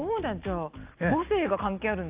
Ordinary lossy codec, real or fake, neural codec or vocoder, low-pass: Opus, 24 kbps; real; none; 3.6 kHz